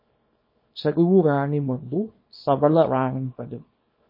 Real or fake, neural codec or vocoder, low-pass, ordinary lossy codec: fake; codec, 24 kHz, 0.9 kbps, WavTokenizer, small release; 5.4 kHz; MP3, 24 kbps